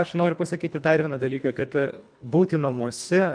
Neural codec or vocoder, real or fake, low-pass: codec, 24 kHz, 1.5 kbps, HILCodec; fake; 9.9 kHz